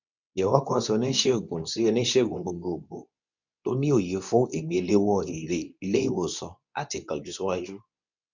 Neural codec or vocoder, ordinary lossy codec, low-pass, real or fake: codec, 24 kHz, 0.9 kbps, WavTokenizer, medium speech release version 2; none; 7.2 kHz; fake